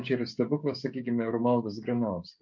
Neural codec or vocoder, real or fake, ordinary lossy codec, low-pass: codec, 16 kHz, 16 kbps, FreqCodec, smaller model; fake; MP3, 48 kbps; 7.2 kHz